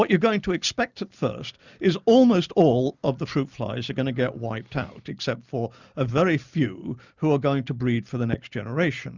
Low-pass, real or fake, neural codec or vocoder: 7.2 kHz; real; none